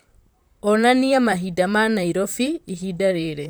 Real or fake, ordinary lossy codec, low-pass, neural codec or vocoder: fake; none; none; vocoder, 44.1 kHz, 128 mel bands, Pupu-Vocoder